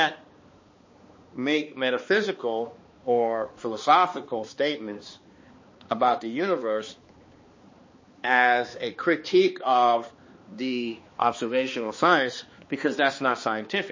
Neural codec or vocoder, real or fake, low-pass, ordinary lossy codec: codec, 16 kHz, 2 kbps, X-Codec, HuBERT features, trained on balanced general audio; fake; 7.2 kHz; MP3, 32 kbps